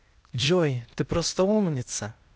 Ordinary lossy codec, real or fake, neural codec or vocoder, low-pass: none; fake; codec, 16 kHz, 0.8 kbps, ZipCodec; none